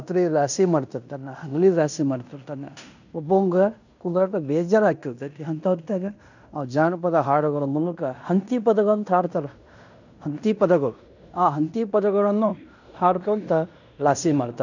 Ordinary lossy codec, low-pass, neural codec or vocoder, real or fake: none; 7.2 kHz; codec, 16 kHz in and 24 kHz out, 0.9 kbps, LongCat-Audio-Codec, fine tuned four codebook decoder; fake